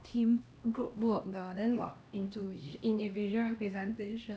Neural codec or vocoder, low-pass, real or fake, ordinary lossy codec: codec, 16 kHz, 1 kbps, X-Codec, HuBERT features, trained on LibriSpeech; none; fake; none